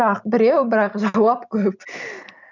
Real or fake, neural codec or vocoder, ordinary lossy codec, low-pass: real; none; none; 7.2 kHz